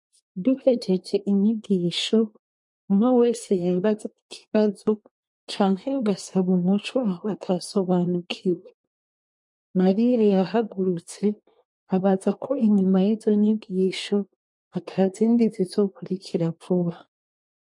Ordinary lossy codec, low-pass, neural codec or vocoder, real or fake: MP3, 48 kbps; 10.8 kHz; codec, 24 kHz, 1 kbps, SNAC; fake